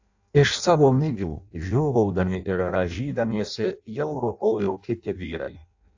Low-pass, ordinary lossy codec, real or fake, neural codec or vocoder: 7.2 kHz; AAC, 48 kbps; fake; codec, 16 kHz in and 24 kHz out, 0.6 kbps, FireRedTTS-2 codec